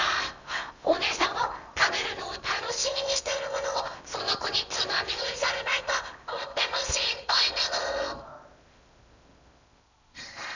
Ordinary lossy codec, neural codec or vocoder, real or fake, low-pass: none; codec, 16 kHz in and 24 kHz out, 0.8 kbps, FocalCodec, streaming, 65536 codes; fake; 7.2 kHz